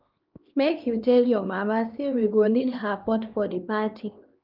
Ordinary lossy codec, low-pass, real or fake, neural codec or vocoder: Opus, 32 kbps; 5.4 kHz; fake; codec, 16 kHz, 2 kbps, X-Codec, HuBERT features, trained on LibriSpeech